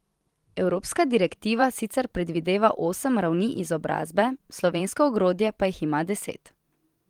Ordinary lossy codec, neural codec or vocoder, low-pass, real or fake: Opus, 24 kbps; vocoder, 44.1 kHz, 128 mel bands every 512 samples, BigVGAN v2; 19.8 kHz; fake